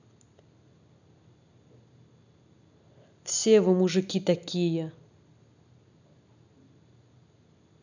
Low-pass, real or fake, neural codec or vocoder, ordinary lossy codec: 7.2 kHz; real; none; none